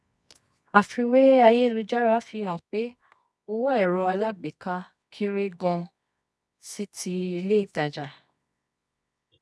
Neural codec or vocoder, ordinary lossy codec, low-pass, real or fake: codec, 24 kHz, 0.9 kbps, WavTokenizer, medium music audio release; none; none; fake